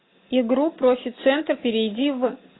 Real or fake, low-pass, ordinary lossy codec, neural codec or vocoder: real; 7.2 kHz; AAC, 16 kbps; none